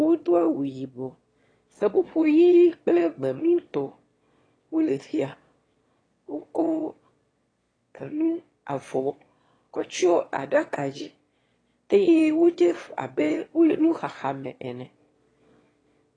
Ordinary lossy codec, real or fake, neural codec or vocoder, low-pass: AAC, 32 kbps; fake; autoencoder, 22.05 kHz, a latent of 192 numbers a frame, VITS, trained on one speaker; 9.9 kHz